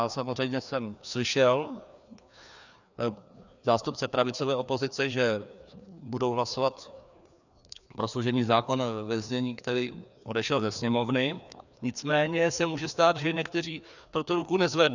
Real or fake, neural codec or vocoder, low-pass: fake; codec, 16 kHz, 2 kbps, FreqCodec, larger model; 7.2 kHz